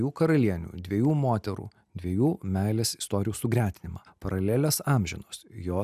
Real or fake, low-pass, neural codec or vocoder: real; 14.4 kHz; none